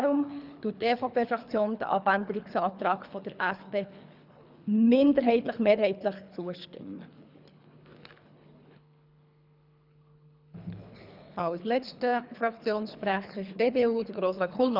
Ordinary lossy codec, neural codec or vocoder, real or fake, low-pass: none; codec, 24 kHz, 3 kbps, HILCodec; fake; 5.4 kHz